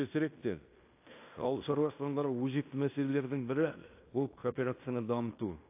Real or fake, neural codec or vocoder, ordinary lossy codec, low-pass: fake; codec, 16 kHz in and 24 kHz out, 0.9 kbps, LongCat-Audio-Codec, fine tuned four codebook decoder; AAC, 24 kbps; 3.6 kHz